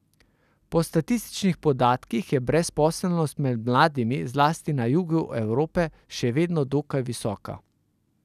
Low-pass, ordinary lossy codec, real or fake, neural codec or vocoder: 14.4 kHz; none; real; none